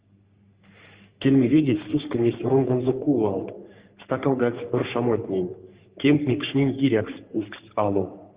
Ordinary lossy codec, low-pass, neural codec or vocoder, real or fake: Opus, 32 kbps; 3.6 kHz; codec, 44.1 kHz, 3.4 kbps, Pupu-Codec; fake